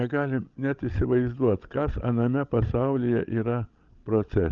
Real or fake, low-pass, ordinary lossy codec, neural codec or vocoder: fake; 7.2 kHz; Opus, 24 kbps; codec, 16 kHz, 16 kbps, FreqCodec, larger model